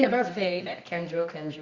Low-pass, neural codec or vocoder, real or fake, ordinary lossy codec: 7.2 kHz; codec, 24 kHz, 0.9 kbps, WavTokenizer, medium music audio release; fake; Opus, 64 kbps